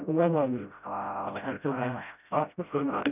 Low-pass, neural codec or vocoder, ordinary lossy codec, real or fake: 3.6 kHz; codec, 16 kHz, 0.5 kbps, FreqCodec, smaller model; none; fake